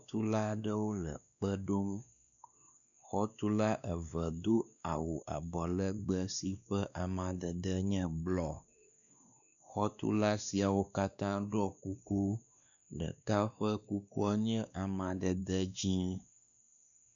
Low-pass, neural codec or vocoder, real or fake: 7.2 kHz; codec, 16 kHz, 2 kbps, X-Codec, WavLM features, trained on Multilingual LibriSpeech; fake